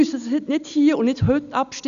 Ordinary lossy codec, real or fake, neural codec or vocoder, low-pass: none; real; none; 7.2 kHz